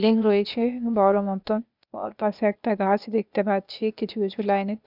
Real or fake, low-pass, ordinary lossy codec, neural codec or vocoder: fake; 5.4 kHz; none; codec, 16 kHz, 0.8 kbps, ZipCodec